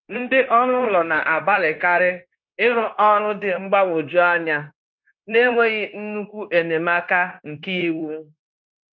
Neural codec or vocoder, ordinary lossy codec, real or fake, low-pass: codec, 16 kHz, 0.9 kbps, LongCat-Audio-Codec; none; fake; 7.2 kHz